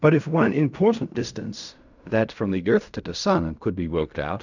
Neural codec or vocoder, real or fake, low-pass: codec, 16 kHz in and 24 kHz out, 0.4 kbps, LongCat-Audio-Codec, fine tuned four codebook decoder; fake; 7.2 kHz